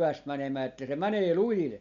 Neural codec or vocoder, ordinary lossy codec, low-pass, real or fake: none; none; 7.2 kHz; real